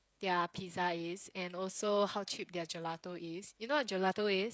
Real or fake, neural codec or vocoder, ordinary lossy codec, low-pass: fake; codec, 16 kHz, 16 kbps, FreqCodec, smaller model; none; none